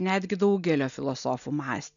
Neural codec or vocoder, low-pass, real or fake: none; 7.2 kHz; real